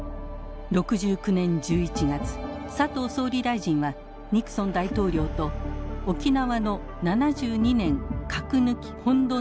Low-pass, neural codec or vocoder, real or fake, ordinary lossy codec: none; none; real; none